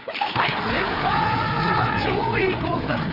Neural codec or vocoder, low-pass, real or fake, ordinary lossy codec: codec, 16 kHz, 4 kbps, FreqCodec, larger model; 5.4 kHz; fake; none